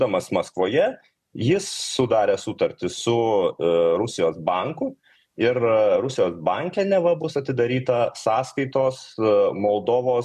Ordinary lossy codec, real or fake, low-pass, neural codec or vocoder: MP3, 96 kbps; real; 14.4 kHz; none